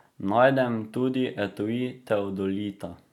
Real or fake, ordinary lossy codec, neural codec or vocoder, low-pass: real; none; none; 19.8 kHz